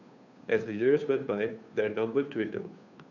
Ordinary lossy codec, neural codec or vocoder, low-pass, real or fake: none; codec, 16 kHz, 2 kbps, FunCodec, trained on Chinese and English, 25 frames a second; 7.2 kHz; fake